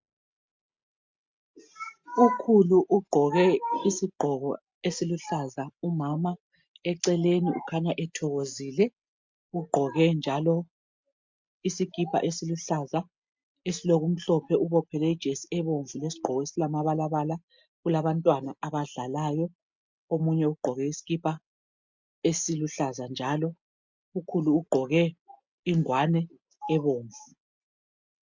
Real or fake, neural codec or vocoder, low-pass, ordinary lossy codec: real; none; 7.2 kHz; MP3, 64 kbps